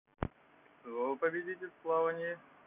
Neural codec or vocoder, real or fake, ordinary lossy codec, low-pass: none; real; none; 3.6 kHz